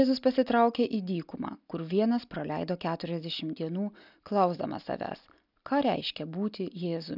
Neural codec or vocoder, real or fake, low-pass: none; real; 5.4 kHz